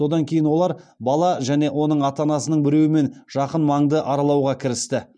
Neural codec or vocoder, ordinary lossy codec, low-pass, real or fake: none; none; 9.9 kHz; real